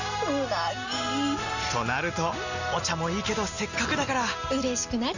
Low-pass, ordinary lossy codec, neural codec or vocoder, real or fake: 7.2 kHz; none; none; real